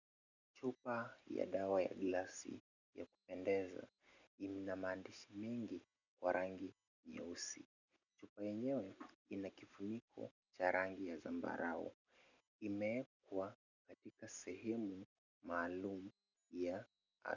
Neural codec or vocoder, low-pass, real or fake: none; 7.2 kHz; real